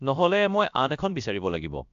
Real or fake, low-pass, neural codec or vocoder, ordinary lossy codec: fake; 7.2 kHz; codec, 16 kHz, about 1 kbps, DyCAST, with the encoder's durations; AAC, 96 kbps